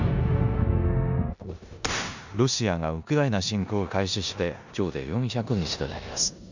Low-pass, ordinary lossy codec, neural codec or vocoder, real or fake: 7.2 kHz; none; codec, 16 kHz in and 24 kHz out, 0.9 kbps, LongCat-Audio-Codec, four codebook decoder; fake